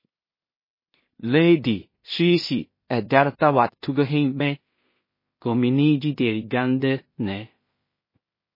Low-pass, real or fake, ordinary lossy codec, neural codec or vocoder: 5.4 kHz; fake; MP3, 24 kbps; codec, 16 kHz in and 24 kHz out, 0.4 kbps, LongCat-Audio-Codec, two codebook decoder